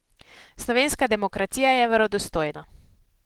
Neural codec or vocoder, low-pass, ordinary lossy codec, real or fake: none; 19.8 kHz; Opus, 16 kbps; real